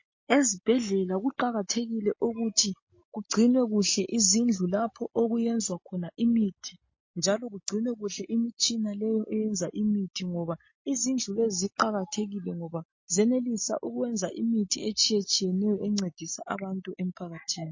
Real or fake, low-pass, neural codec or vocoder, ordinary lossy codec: real; 7.2 kHz; none; MP3, 32 kbps